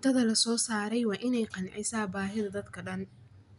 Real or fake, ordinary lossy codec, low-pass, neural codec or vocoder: real; none; 10.8 kHz; none